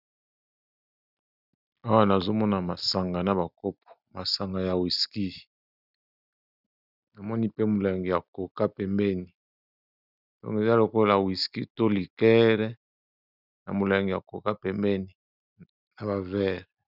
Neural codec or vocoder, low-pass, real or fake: none; 5.4 kHz; real